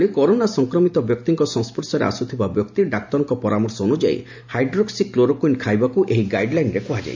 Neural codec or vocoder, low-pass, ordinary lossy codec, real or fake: none; 7.2 kHz; MP3, 64 kbps; real